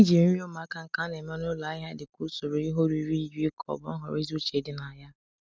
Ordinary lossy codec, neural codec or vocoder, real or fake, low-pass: none; none; real; none